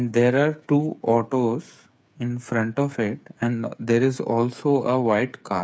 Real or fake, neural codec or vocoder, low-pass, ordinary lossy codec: fake; codec, 16 kHz, 8 kbps, FreqCodec, smaller model; none; none